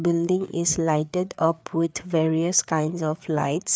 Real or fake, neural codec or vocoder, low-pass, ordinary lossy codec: fake; codec, 16 kHz, 4 kbps, FreqCodec, larger model; none; none